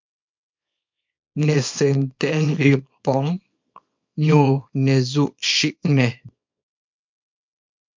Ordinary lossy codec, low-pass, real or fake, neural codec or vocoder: MP3, 48 kbps; 7.2 kHz; fake; codec, 24 kHz, 0.9 kbps, WavTokenizer, small release